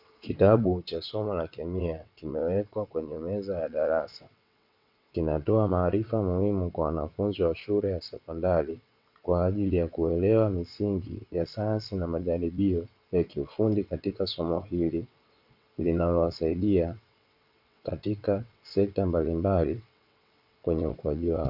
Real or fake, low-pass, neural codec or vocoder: fake; 5.4 kHz; vocoder, 22.05 kHz, 80 mel bands, WaveNeXt